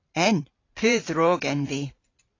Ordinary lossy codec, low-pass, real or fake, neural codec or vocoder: AAC, 32 kbps; 7.2 kHz; fake; vocoder, 44.1 kHz, 128 mel bands every 512 samples, BigVGAN v2